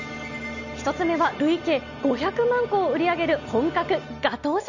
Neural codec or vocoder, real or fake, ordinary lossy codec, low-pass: none; real; none; 7.2 kHz